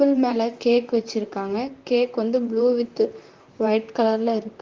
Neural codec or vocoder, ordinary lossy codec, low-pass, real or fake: vocoder, 44.1 kHz, 128 mel bands, Pupu-Vocoder; Opus, 32 kbps; 7.2 kHz; fake